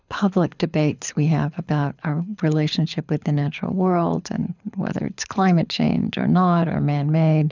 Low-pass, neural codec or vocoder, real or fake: 7.2 kHz; codec, 24 kHz, 6 kbps, HILCodec; fake